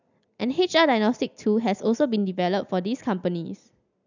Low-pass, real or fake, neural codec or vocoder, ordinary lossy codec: 7.2 kHz; real; none; none